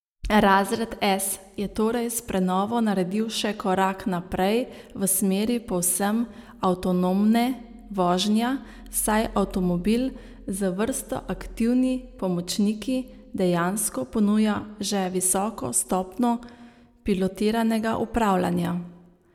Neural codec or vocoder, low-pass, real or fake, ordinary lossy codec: none; 19.8 kHz; real; none